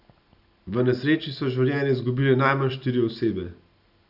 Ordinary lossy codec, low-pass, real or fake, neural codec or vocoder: none; 5.4 kHz; real; none